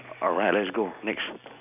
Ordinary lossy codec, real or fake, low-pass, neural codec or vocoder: none; real; 3.6 kHz; none